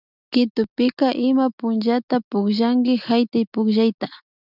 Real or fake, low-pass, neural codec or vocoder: real; 5.4 kHz; none